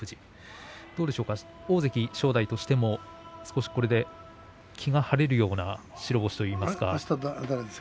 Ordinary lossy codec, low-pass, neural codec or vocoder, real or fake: none; none; none; real